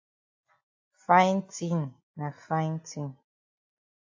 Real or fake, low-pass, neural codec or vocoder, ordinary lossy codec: real; 7.2 kHz; none; AAC, 48 kbps